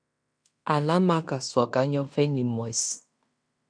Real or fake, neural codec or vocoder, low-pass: fake; codec, 16 kHz in and 24 kHz out, 0.9 kbps, LongCat-Audio-Codec, fine tuned four codebook decoder; 9.9 kHz